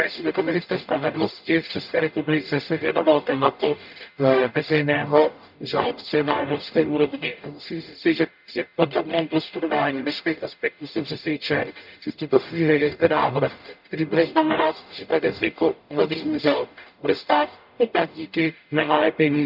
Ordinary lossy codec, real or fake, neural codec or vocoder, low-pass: none; fake; codec, 44.1 kHz, 0.9 kbps, DAC; 5.4 kHz